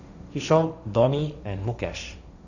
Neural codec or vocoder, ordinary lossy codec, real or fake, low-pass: codec, 16 kHz, 1.1 kbps, Voila-Tokenizer; none; fake; 7.2 kHz